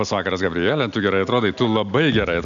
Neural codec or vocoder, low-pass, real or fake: none; 7.2 kHz; real